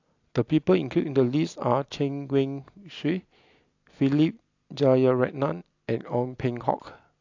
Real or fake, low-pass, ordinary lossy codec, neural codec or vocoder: real; 7.2 kHz; AAC, 48 kbps; none